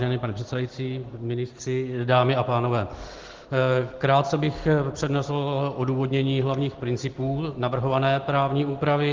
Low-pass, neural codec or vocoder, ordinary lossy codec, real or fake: 7.2 kHz; none; Opus, 16 kbps; real